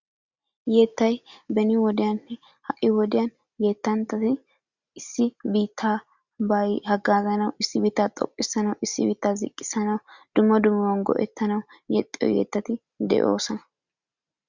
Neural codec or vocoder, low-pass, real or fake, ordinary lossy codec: none; 7.2 kHz; real; Opus, 64 kbps